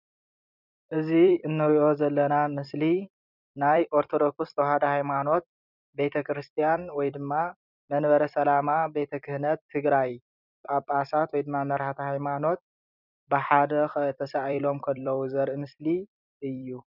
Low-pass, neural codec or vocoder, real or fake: 5.4 kHz; vocoder, 44.1 kHz, 128 mel bands every 256 samples, BigVGAN v2; fake